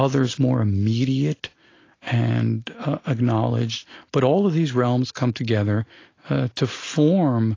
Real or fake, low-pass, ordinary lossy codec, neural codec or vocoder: real; 7.2 kHz; AAC, 32 kbps; none